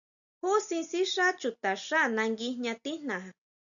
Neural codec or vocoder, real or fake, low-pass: none; real; 7.2 kHz